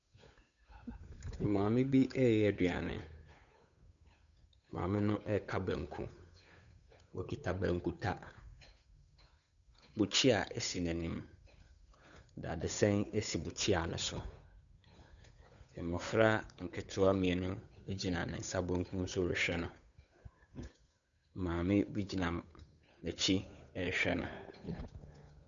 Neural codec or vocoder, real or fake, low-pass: codec, 16 kHz, 8 kbps, FunCodec, trained on Chinese and English, 25 frames a second; fake; 7.2 kHz